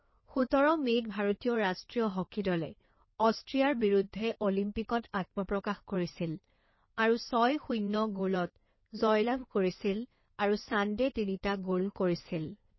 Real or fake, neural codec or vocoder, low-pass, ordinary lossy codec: fake; codec, 16 kHz in and 24 kHz out, 2.2 kbps, FireRedTTS-2 codec; 7.2 kHz; MP3, 24 kbps